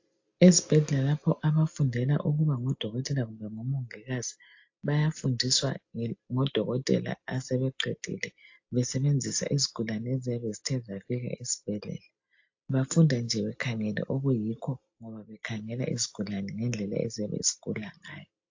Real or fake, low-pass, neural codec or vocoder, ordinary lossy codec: real; 7.2 kHz; none; AAC, 48 kbps